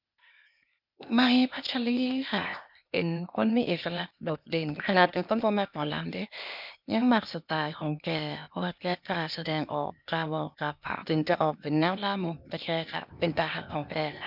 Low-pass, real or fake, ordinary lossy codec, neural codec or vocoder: 5.4 kHz; fake; none; codec, 16 kHz, 0.8 kbps, ZipCodec